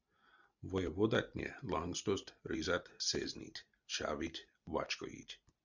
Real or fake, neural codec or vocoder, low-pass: real; none; 7.2 kHz